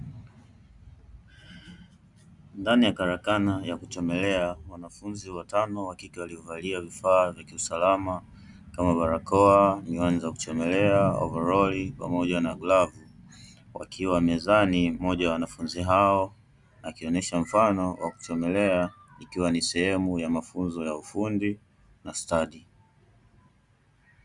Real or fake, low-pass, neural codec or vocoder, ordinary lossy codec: real; 10.8 kHz; none; Opus, 64 kbps